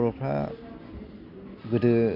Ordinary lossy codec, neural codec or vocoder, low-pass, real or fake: none; none; 5.4 kHz; real